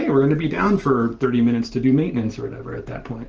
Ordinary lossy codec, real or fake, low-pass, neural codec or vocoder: Opus, 16 kbps; real; 7.2 kHz; none